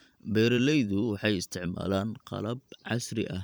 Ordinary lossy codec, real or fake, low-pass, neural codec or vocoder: none; fake; none; vocoder, 44.1 kHz, 128 mel bands every 512 samples, BigVGAN v2